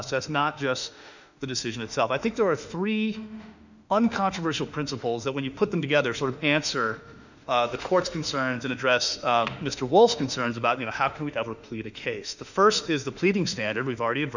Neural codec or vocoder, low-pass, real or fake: autoencoder, 48 kHz, 32 numbers a frame, DAC-VAE, trained on Japanese speech; 7.2 kHz; fake